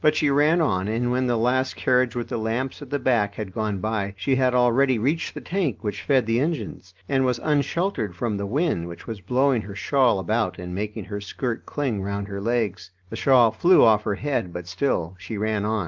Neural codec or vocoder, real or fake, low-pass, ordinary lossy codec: none; real; 7.2 kHz; Opus, 32 kbps